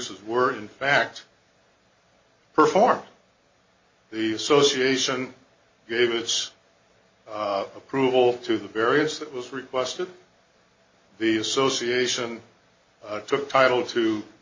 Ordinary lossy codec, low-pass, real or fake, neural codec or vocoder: MP3, 32 kbps; 7.2 kHz; real; none